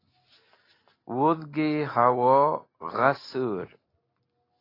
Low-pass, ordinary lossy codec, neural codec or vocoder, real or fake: 5.4 kHz; AAC, 24 kbps; none; real